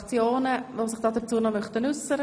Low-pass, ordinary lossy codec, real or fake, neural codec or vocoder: none; none; real; none